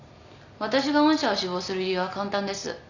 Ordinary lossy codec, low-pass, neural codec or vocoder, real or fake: none; 7.2 kHz; none; real